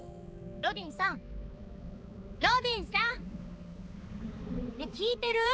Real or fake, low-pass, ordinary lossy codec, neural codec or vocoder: fake; none; none; codec, 16 kHz, 4 kbps, X-Codec, HuBERT features, trained on balanced general audio